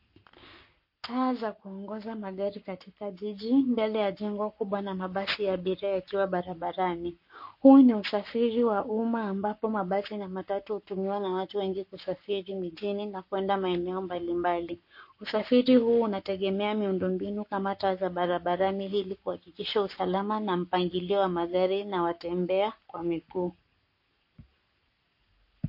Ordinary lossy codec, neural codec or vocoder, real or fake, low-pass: MP3, 32 kbps; codec, 44.1 kHz, 7.8 kbps, Pupu-Codec; fake; 5.4 kHz